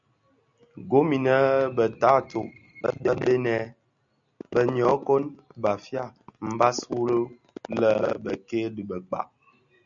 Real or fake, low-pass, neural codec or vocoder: real; 7.2 kHz; none